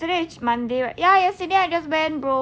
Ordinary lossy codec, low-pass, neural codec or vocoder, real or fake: none; none; none; real